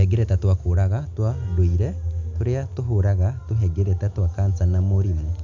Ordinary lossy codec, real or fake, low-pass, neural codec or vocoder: none; real; 7.2 kHz; none